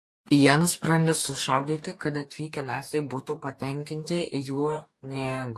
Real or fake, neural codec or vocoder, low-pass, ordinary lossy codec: fake; codec, 44.1 kHz, 2.6 kbps, DAC; 14.4 kHz; AAC, 64 kbps